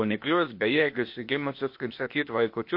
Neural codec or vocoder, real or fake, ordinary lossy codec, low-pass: codec, 16 kHz, 0.8 kbps, ZipCodec; fake; MP3, 32 kbps; 5.4 kHz